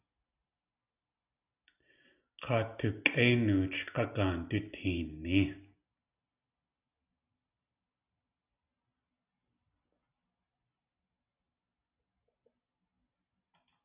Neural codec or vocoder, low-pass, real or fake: none; 3.6 kHz; real